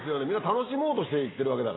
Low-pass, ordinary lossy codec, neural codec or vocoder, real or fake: 7.2 kHz; AAC, 16 kbps; none; real